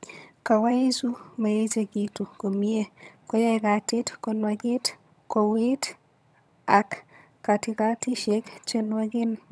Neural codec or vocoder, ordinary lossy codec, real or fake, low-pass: vocoder, 22.05 kHz, 80 mel bands, HiFi-GAN; none; fake; none